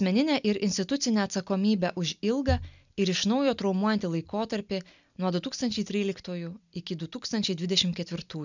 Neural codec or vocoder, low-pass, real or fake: none; 7.2 kHz; real